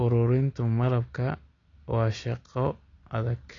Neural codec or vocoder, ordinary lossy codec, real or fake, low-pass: none; AAC, 32 kbps; real; 7.2 kHz